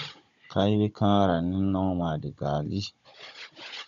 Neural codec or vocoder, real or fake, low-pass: codec, 16 kHz, 16 kbps, FunCodec, trained on Chinese and English, 50 frames a second; fake; 7.2 kHz